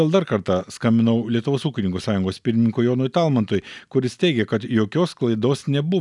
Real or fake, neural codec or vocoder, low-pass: real; none; 10.8 kHz